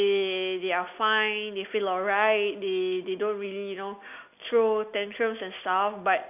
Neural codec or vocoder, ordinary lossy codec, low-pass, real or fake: none; AAC, 32 kbps; 3.6 kHz; real